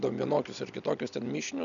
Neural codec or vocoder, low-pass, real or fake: none; 7.2 kHz; real